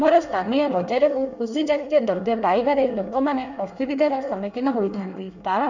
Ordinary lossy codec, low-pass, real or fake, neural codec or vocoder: none; 7.2 kHz; fake; codec, 24 kHz, 1 kbps, SNAC